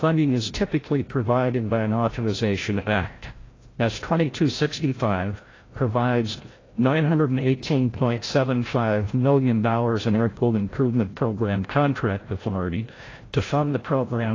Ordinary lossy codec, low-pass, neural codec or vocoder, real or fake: AAC, 32 kbps; 7.2 kHz; codec, 16 kHz, 0.5 kbps, FreqCodec, larger model; fake